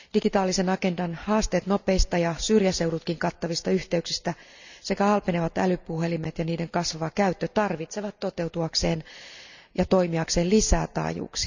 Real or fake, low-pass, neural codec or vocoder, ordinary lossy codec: real; 7.2 kHz; none; none